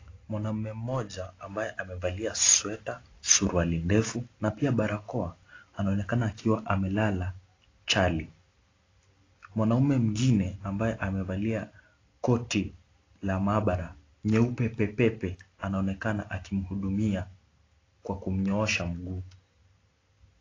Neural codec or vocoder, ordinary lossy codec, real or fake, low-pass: none; AAC, 32 kbps; real; 7.2 kHz